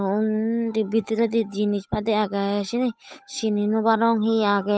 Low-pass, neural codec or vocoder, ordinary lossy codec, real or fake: none; codec, 16 kHz, 8 kbps, FunCodec, trained on Chinese and English, 25 frames a second; none; fake